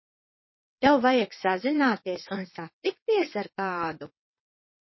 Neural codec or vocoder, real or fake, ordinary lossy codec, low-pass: vocoder, 22.05 kHz, 80 mel bands, WaveNeXt; fake; MP3, 24 kbps; 7.2 kHz